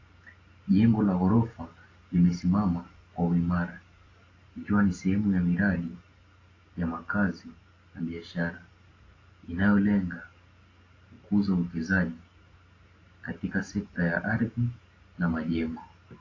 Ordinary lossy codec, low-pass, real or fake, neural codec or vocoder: AAC, 32 kbps; 7.2 kHz; real; none